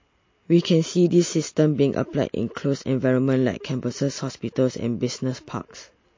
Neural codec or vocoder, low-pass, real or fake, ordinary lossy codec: none; 7.2 kHz; real; MP3, 32 kbps